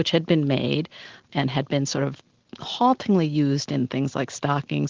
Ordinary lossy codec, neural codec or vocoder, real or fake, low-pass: Opus, 16 kbps; none; real; 7.2 kHz